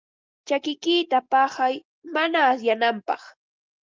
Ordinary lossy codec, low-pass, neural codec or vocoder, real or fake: Opus, 32 kbps; 7.2 kHz; none; real